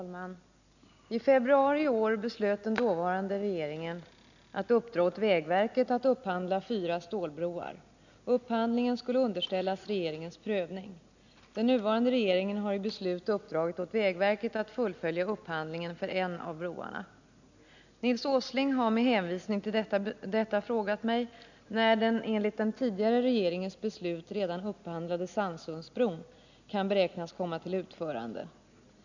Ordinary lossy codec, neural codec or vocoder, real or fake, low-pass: none; none; real; 7.2 kHz